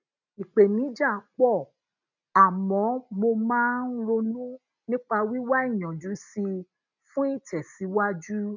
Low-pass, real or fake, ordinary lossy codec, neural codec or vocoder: 7.2 kHz; real; none; none